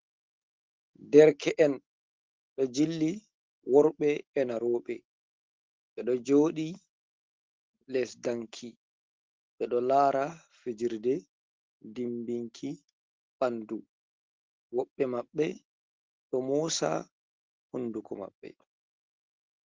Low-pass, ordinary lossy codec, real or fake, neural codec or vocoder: 7.2 kHz; Opus, 16 kbps; real; none